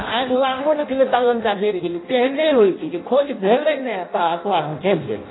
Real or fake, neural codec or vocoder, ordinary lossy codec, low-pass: fake; codec, 16 kHz in and 24 kHz out, 0.6 kbps, FireRedTTS-2 codec; AAC, 16 kbps; 7.2 kHz